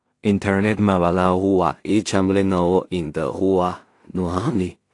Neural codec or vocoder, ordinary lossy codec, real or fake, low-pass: codec, 16 kHz in and 24 kHz out, 0.4 kbps, LongCat-Audio-Codec, two codebook decoder; AAC, 48 kbps; fake; 10.8 kHz